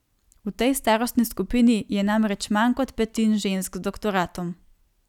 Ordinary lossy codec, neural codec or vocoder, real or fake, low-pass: none; none; real; 19.8 kHz